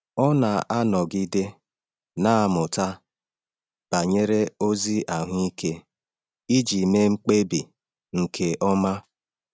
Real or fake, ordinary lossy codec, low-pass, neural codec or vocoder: real; none; none; none